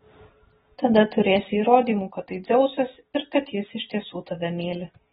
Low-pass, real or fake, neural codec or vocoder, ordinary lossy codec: 19.8 kHz; real; none; AAC, 16 kbps